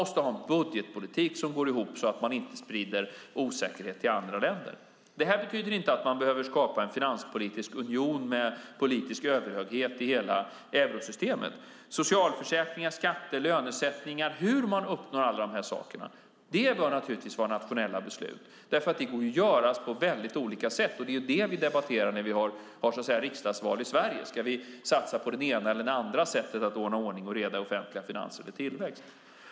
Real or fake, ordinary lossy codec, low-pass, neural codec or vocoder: real; none; none; none